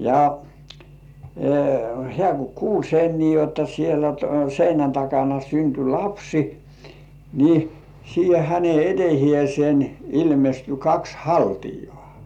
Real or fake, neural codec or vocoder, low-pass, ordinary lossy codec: real; none; 19.8 kHz; none